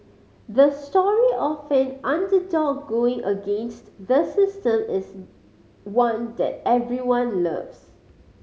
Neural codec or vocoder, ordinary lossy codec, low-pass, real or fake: none; none; none; real